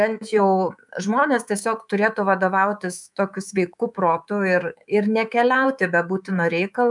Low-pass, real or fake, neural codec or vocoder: 10.8 kHz; fake; codec, 24 kHz, 3.1 kbps, DualCodec